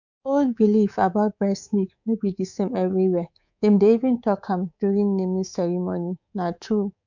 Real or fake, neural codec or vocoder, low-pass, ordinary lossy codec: fake; codec, 24 kHz, 3.1 kbps, DualCodec; 7.2 kHz; none